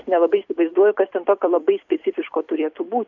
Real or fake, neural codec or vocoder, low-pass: fake; vocoder, 44.1 kHz, 128 mel bands every 256 samples, BigVGAN v2; 7.2 kHz